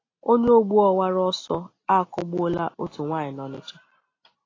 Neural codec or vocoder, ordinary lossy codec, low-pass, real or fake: none; AAC, 32 kbps; 7.2 kHz; real